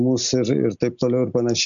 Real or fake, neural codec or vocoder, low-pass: real; none; 7.2 kHz